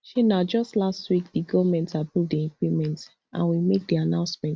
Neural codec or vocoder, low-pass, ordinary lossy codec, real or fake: none; none; none; real